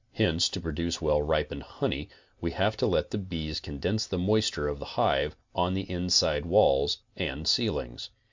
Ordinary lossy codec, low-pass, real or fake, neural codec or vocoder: MP3, 64 kbps; 7.2 kHz; real; none